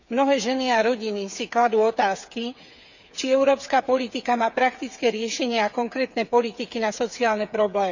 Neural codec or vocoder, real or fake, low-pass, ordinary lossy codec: codec, 16 kHz, 8 kbps, FreqCodec, smaller model; fake; 7.2 kHz; none